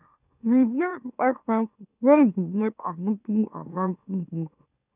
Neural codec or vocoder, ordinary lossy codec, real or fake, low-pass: autoencoder, 44.1 kHz, a latent of 192 numbers a frame, MeloTTS; none; fake; 3.6 kHz